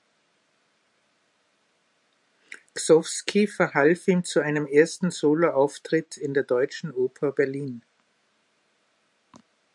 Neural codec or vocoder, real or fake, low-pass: vocoder, 44.1 kHz, 128 mel bands every 512 samples, BigVGAN v2; fake; 10.8 kHz